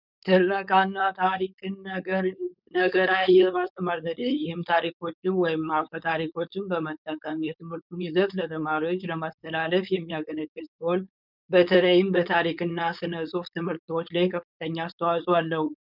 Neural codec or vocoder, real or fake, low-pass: codec, 16 kHz, 4.8 kbps, FACodec; fake; 5.4 kHz